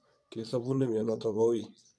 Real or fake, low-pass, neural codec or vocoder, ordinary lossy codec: fake; none; vocoder, 22.05 kHz, 80 mel bands, Vocos; none